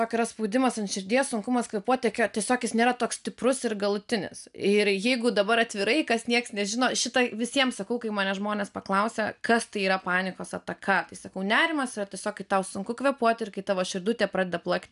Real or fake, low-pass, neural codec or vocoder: real; 10.8 kHz; none